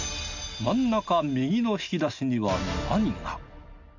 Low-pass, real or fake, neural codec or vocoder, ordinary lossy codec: 7.2 kHz; real; none; none